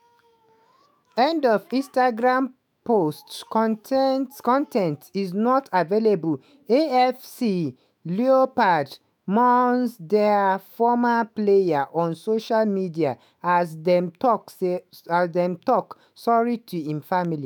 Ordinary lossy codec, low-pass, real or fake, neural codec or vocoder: none; none; fake; autoencoder, 48 kHz, 128 numbers a frame, DAC-VAE, trained on Japanese speech